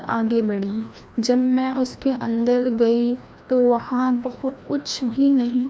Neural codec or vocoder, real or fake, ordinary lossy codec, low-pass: codec, 16 kHz, 1 kbps, FreqCodec, larger model; fake; none; none